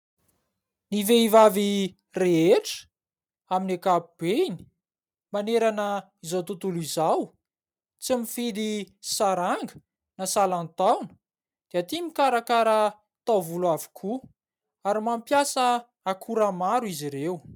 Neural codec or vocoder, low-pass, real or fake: vocoder, 44.1 kHz, 128 mel bands every 256 samples, BigVGAN v2; 19.8 kHz; fake